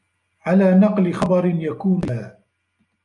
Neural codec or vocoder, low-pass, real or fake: none; 10.8 kHz; real